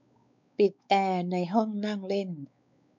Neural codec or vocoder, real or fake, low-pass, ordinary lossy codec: codec, 16 kHz, 4 kbps, X-Codec, WavLM features, trained on Multilingual LibriSpeech; fake; 7.2 kHz; none